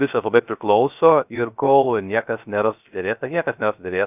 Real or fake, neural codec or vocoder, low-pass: fake; codec, 16 kHz, 0.3 kbps, FocalCodec; 3.6 kHz